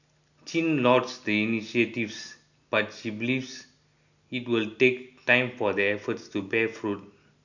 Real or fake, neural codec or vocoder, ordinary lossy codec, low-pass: real; none; none; 7.2 kHz